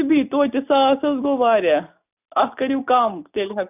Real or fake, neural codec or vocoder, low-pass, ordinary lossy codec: real; none; 3.6 kHz; none